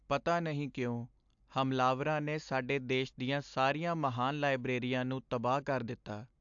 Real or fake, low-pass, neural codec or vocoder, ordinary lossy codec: real; 7.2 kHz; none; AAC, 64 kbps